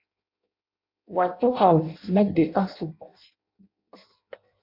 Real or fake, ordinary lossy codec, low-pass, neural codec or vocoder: fake; MP3, 48 kbps; 5.4 kHz; codec, 16 kHz in and 24 kHz out, 0.6 kbps, FireRedTTS-2 codec